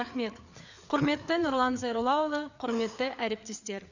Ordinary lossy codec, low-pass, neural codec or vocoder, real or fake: none; 7.2 kHz; codec, 16 kHz, 4 kbps, FreqCodec, larger model; fake